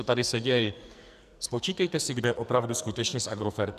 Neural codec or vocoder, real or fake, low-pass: codec, 44.1 kHz, 2.6 kbps, SNAC; fake; 14.4 kHz